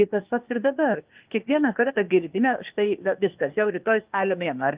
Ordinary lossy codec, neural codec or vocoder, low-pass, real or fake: Opus, 24 kbps; codec, 16 kHz, 0.8 kbps, ZipCodec; 3.6 kHz; fake